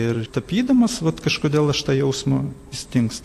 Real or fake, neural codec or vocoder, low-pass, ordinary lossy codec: fake; vocoder, 44.1 kHz, 128 mel bands every 256 samples, BigVGAN v2; 14.4 kHz; AAC, 64 kbps